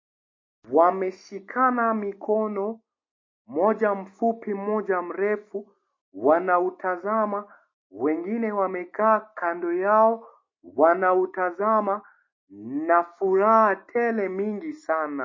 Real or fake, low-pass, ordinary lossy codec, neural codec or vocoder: real; 7.2 kHz; MP3, 32 kbps; none